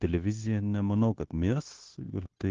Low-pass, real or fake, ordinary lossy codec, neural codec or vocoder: 7.2 kHz; fake; Opus, 16 kbps; codec, 16 kHz, 0.9 kbps, LongCat-Audio-Codec